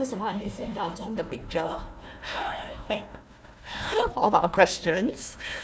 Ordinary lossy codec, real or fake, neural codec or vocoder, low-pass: none; fake; codec, 16 kHz, 1 kbps, FunCodec, trained on Chinese and English, 50 frames a second; none